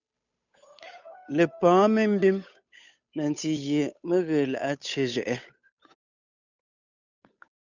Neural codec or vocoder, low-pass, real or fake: codec, 16 kHz, 8 kbps, FunCodec, trained on Chinese and English, 25 frames a second; 7.2 kHz; fake